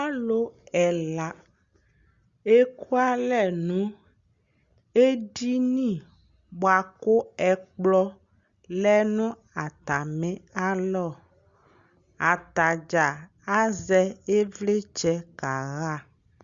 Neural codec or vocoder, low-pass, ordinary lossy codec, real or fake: none; 7.2 kHz; Opus, 64 kbps; real